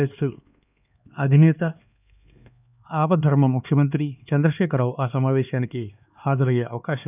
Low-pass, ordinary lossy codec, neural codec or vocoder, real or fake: 3.6 kHz; none; codec, 16 kHz, 2 kbps, X-Codec, HuBERT features, trained on LibriSpeech; fake